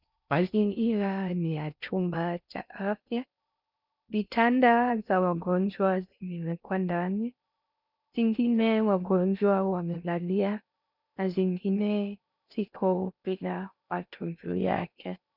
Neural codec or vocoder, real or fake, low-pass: codec, 16 kHz in and 24 kHz out, 0.6 kbps, FocalCodec, streaming, 4096 codes; fake; 5.4 kHz